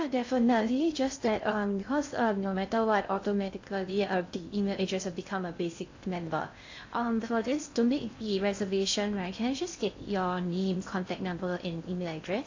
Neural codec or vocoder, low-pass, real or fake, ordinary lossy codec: codec, 16 kHz in and 24 kHz out, 0.6 kbps, FocalCodec, streaming, 2048 codes; 7.2 kHz; fake; AAC, 48 kbps